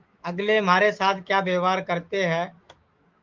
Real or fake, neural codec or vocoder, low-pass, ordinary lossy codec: fake; autoencoder, 48 kHz, 128 numbers a frame, DAC-VAE, trained on Japanese speech; 7.2 kHz; Opus, 32 kbps